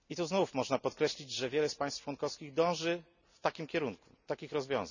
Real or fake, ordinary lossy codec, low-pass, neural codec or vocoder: real; none; 7.2 kHz; none